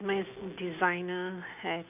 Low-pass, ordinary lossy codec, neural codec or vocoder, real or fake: 3.6 kHz; none; none; real